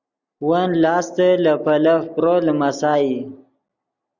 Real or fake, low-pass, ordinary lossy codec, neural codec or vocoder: real; 7.2 kHz; Opus, 64 kbps; none